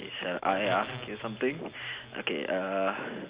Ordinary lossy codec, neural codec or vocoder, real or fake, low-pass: Opus, 64 kbps; none; real; 3.6 kHz